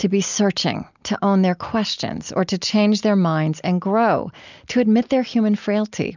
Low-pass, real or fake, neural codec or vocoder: 7.2 kHz; real; none